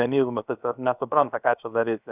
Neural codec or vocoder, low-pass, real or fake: codec, 16 kHz, about 1 kbps, DyCAST, with the encoder's durations; 3.6 kHz; fake